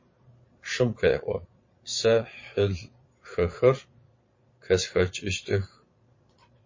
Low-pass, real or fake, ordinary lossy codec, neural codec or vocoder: 7.2 kHz; fake; MP3, 32 kbps; vocoder, 22.05 kHz, 80 mel bands, Vocos